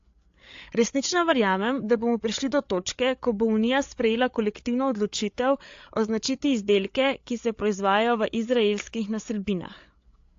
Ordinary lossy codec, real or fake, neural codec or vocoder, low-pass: AAC, 48 kbps; fake; codec, 16 kHz, 8 kbps, FreqCodec, larger model; 7.2 kHz